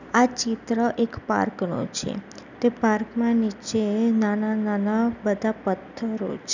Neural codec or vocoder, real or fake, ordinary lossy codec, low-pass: none; real; none; 7.2 kHz